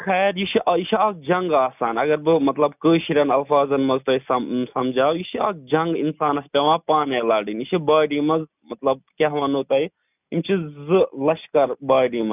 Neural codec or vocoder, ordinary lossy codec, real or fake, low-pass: none; none; real; 3.6 kHz